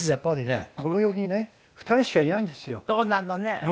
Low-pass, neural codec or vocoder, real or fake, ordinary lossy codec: none; codec, 16 kHz, 0.8 kbps, ZipCodec; fake; none